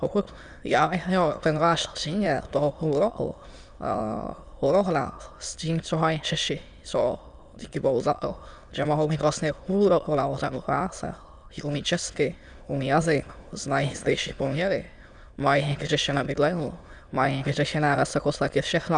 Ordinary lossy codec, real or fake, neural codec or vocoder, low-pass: Opus, 64 kbps; fake; autoencoder, 22.05 kHz, a latent of 192 numbers a frame, VITS, trained on many speakers; 9.9 kHz